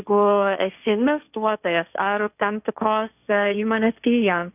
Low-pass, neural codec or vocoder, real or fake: 3.6 kHz; codec, 16 kHz, 1.1 kbps, Voila-Tokenizer; fake